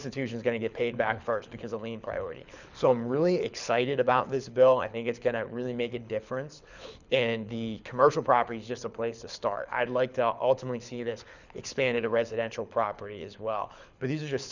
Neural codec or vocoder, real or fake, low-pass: codec, 24 kHz, 6 kbps, HILCodec; fake; 7.2 kHz